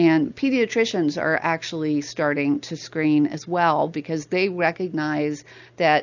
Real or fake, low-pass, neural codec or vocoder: real; 7.2 kHz; none